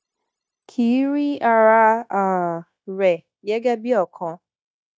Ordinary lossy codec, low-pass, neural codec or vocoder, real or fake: none; none; codec, 16 kHz, 0.9 kbps, LongCat-Audio-Codec; fake